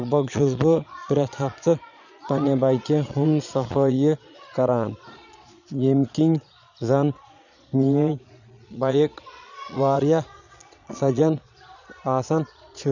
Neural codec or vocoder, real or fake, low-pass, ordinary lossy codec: vocoder, 22.05 kHz, 80 mel bands, Vocos; fake; 7.2 kHz; none